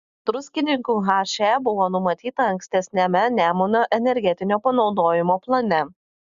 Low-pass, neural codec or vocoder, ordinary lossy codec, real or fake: 7.2 kHz; none; Opus, 64 kbps; real